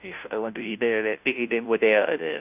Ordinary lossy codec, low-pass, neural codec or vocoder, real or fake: none; 3.6 kHz; codec, 16 kHz, 0.5 kbps, FunCodec, trained on Chinese and English, 25 frames a second; fake